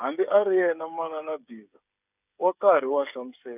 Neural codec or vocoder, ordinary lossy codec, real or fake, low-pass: codec, 16 kHz, 8 kbps, FreqCodec, smaller model; none; fake; 3.6 kHz